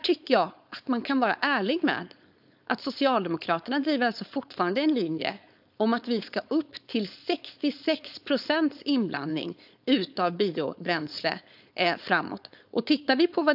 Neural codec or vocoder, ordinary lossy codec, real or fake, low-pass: codec, 16 kHz, 4.8 kbps, FACodec; AAC, 48 kbps; fake; 5.4 kHz